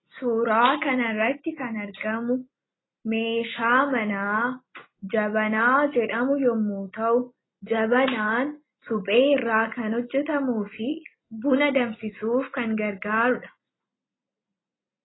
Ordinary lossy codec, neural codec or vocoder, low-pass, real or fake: AAC, 16 kbps; none; 7.2 kHz; real